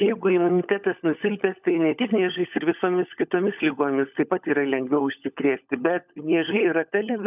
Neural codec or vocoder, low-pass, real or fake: codec, 16 kHz, 16 kbps, FunCodec, trained on LibriTTS, 50 frames a second; 3.6 kHz; fake